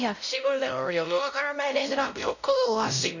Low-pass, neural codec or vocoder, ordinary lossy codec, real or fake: 7.2 kHz; codec, 16 kHz, 0.5 kbps, X-Codec, WavLM features, trained on Multilingual LibriSpeech; none; fake